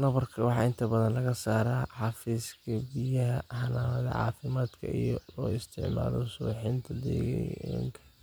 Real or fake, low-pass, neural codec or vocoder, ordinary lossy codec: real; none; none; none